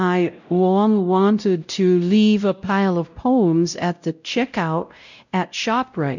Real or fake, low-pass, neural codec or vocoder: fake; 7.2 kHz; codec, 16 kHz, 0.5 kbps, X-Codec, WavLM features, trained on Multilingual LibriSpeech